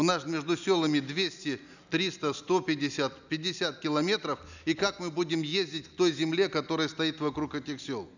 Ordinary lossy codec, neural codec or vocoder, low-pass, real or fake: none; none; 7.2 kHz; real